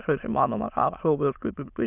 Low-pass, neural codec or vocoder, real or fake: 3.6 kHz; autoencoder, 22.05 kHz, a latent of 192 numbers a frame, VITS, trained on many speakers; fake